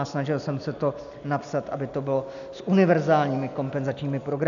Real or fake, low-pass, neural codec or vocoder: real; 7.2 kHz; none